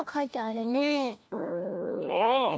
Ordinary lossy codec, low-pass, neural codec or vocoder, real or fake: none; none; codec, 16 kHz, 1 kbps, FunCodec, trained on LibriTTS, 50 frames a second; fake